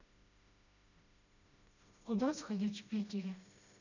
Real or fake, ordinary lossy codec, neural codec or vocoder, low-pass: fake; none; codec, 16 kHz, 1 kbps, FreqCodec, smaller model; 7.2 kHz